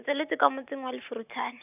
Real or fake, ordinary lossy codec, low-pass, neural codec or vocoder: real; none; 3.6 kHz; none